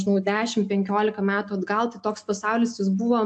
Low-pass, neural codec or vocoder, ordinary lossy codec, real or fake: 9.9 kHz; none; AAC, 64 kbps; real